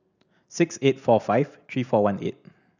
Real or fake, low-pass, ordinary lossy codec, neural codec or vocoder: real; 7.2 kHz; none; none